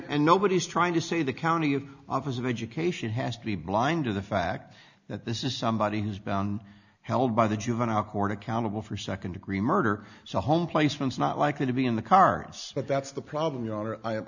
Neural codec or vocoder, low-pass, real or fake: none; 7.2 kHz; real